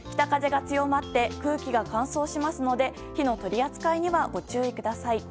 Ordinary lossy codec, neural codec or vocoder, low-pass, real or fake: none; none; none; real